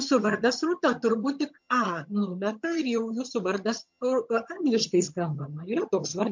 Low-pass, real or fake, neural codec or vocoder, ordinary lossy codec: 7.2 kHz; fake; vocoder, 22.05 kHz, 80 mel bands, HiFi-GAN; MP3, 48 kbps